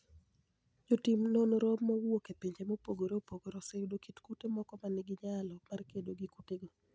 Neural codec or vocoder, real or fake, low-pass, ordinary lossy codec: none; real; none; none